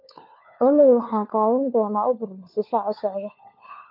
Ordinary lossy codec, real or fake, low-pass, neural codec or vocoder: MP3, 48 kbps; fake; 5.4 kHz; codec, 16 kHz, 4 kbps, FunCodec, trained on LibriTTS, 50 frames a second